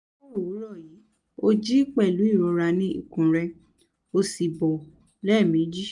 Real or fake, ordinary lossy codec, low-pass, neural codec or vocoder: real; none; 10.8 kHz; none